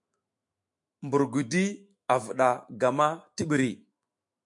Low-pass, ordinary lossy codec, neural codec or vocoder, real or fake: 10.8 kHz; MP3, 64 kbps; autoencoder, 48 kHz, 128 numbers a frame, DAC-VAE, trained on Japanese speech; fake